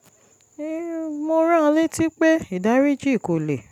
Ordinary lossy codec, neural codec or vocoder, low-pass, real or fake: none; none; 19.8 kHz; real